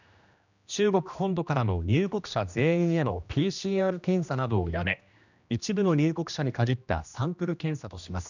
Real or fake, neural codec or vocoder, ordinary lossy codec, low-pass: fake; codec, 16 kHz, 1 kbps, X-Codec, HuBERT features, trained on general audio; none; 7.2 kHz